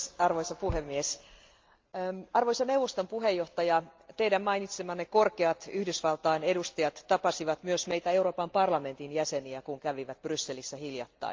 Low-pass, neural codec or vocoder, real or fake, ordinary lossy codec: 7.2 kHz; none; real; Opus, 32 kbps